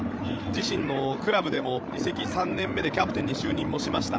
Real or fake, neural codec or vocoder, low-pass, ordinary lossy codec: fake; codec, 16 kHz, 8 kbps, FreqCodec, larger model; none; none